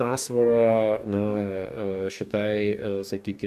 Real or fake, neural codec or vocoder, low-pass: fake; codec, 44.1 kHz, 2.6 kbps, DAC; 14.4 kHz